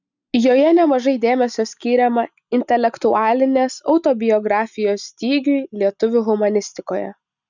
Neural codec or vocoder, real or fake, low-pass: none; real; 7.2 kHz